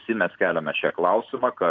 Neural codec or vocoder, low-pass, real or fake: none; 7.2 kHz; real